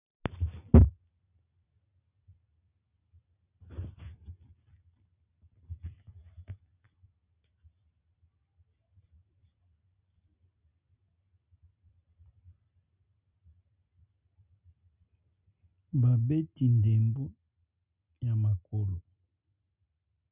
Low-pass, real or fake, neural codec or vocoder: 3.6 kHz; real; none